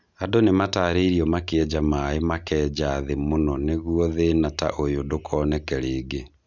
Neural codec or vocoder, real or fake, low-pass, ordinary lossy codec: none; real; 7.2 kHz; none